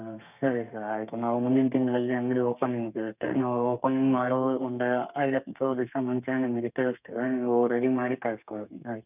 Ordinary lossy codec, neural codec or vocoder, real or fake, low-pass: none; codec, 32 kHz, 1.9 kbps, SNAC; fake; 3.6 kHz